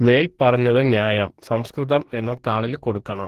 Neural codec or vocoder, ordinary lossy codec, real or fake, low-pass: codec, 44.1 kHz, 2.6 kbps, DAC; Opus, 16 kbps; fake; 14.4 kHz